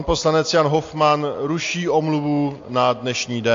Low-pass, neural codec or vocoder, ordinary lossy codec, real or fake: 7.2 kHz; none; AAC, 48 kbps; real